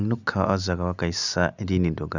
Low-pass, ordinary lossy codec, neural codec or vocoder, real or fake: 7.2 kHz; none; none; real